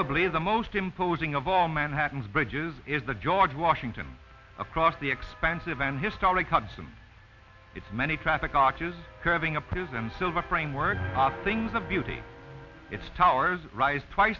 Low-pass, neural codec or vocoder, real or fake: 7.2 kHz; none; real